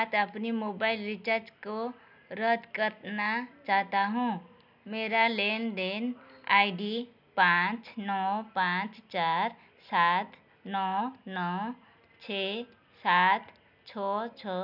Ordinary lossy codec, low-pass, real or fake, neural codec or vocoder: none; 5.4 kHz; real; none